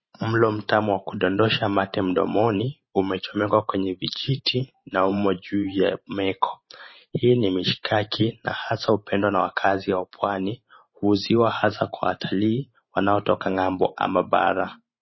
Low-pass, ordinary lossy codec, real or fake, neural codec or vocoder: 7.2 kHz; MP3, 24 kbps; fake; vocoder, 44.1 kHz, 128 mel bands every 512 samples, BigVGAN v2